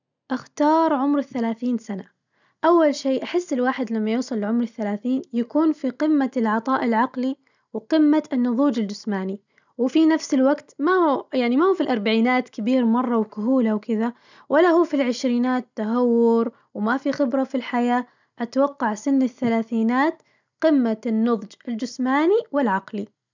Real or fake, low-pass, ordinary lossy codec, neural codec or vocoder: real; 7.2 kHz; none; none